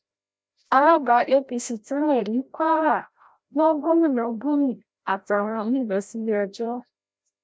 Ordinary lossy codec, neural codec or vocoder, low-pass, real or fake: none; codec, 16 kHz, 0.5 kbps, FreqCodec, larger model; none; fake